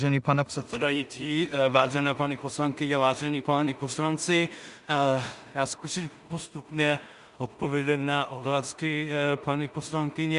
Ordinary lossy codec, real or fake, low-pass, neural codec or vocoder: Opus, 64 kbps; fake; 10.8 kHz; codec, 16 kHz in and 24 kHz out, 0.4 kbps, LongCat-Audio-Codec, two codebook decoder